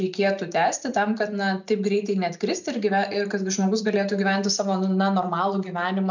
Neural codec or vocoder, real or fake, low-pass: none; real; 7.2 kHz